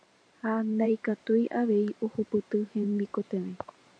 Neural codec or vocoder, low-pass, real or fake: vocoder, 44.1 kHz, 128 mel bands every 512 samples, BigVGAN v2; 9.9 kHz; fake